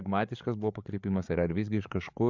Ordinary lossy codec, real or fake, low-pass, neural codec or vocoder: MP3, 64 kbps; fake; 7.2 kHz; codec, 16 kHz, 8 kbps, FreqCodec, larger model